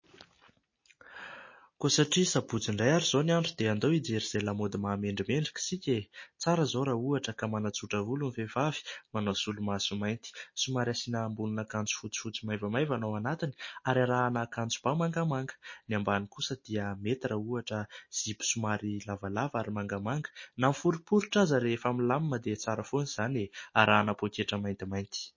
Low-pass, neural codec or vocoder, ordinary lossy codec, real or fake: 7.2 kHz; none; MP3, 32 kbps; real